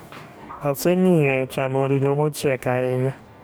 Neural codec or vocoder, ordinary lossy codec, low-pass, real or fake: codec, 44.1 kHz, 2.6 kbps, DAC; none; none; fake